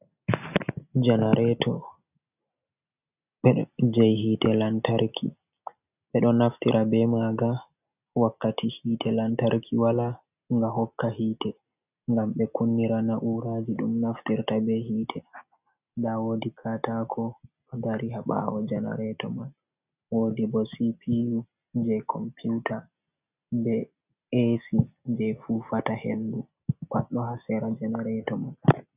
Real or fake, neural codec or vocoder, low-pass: real; none; 3.6 kHz